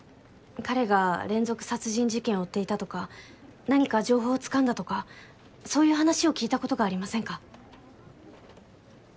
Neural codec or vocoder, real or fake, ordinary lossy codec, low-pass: none; real; none; none